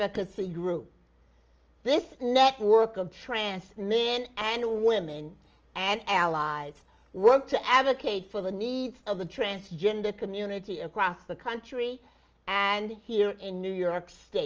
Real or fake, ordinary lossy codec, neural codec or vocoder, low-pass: real; Opus, 24 kbps; none; 7.2 kHz